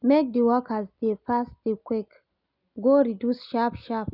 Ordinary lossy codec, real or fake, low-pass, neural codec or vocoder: none; real; 5.4 kHz; none